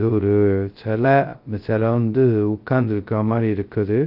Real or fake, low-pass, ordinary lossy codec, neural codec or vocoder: fake; 5.4 kHz; Opus, 32 kbps; codec, 16 kHz, 0.2 kbps, FocalCodec